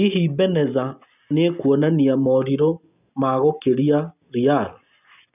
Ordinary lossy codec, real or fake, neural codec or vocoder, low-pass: none; real; none; 3.6 kHz